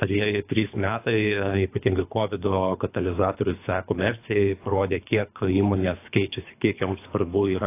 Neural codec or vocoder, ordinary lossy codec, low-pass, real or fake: codec, 24 kHz, 3 kbps, HILCodec; AAC, 24 kbps; 3.6 kHz; fake